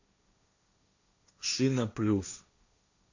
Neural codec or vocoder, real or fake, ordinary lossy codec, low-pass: codec, 16 kHz, 1.1 kbps, Voila-Tokenizer; fake; AAC, 48 kbps; 7.2 kHz